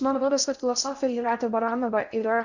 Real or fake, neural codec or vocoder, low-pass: fake; codec, 16 kHz in and 24 kHz out, 0.6 kbps, FocalCodec, streaming, 2048 codes; 7.2 kHz